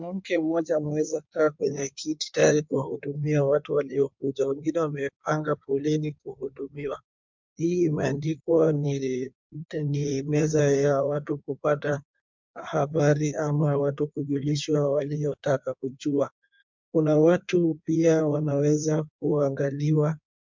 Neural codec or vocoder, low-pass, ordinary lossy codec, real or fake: codec, 16 kHz in and 24 kHz out, 1.1 kbps, FireRedTTS-2 codec; 7.2 kHz; MP3, 64 kbps; fake